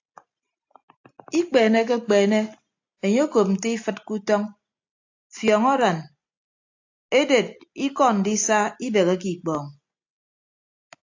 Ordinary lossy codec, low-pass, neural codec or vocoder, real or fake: AAC, 48 kbps; 7.2 kHz; none; real